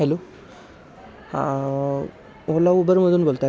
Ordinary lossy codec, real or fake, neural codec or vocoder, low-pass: none; real; none; none